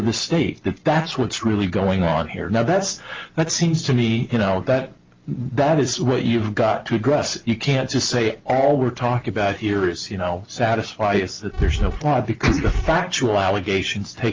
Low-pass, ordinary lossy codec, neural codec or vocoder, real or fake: 7.2 kHz; Opus, 32 kbps; none; real